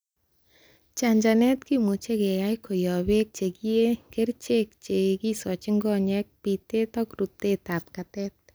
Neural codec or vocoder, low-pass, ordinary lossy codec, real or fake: none; none; none; real